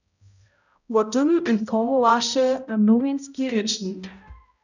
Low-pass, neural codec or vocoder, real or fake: 7.2 kHz; codec, 16 kHz, 0.5 kbps, X-Codec, HuBERT features, trained on balanced general audio; fake